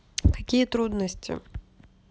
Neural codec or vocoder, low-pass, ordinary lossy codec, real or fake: none; none; none; real